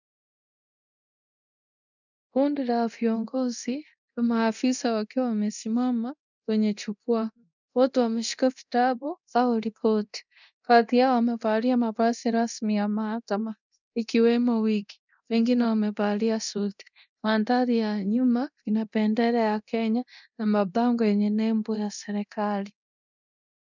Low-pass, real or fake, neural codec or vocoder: 7.2 kHz; fake; codec, 24 kHz, 0.9 kbps, DualCodec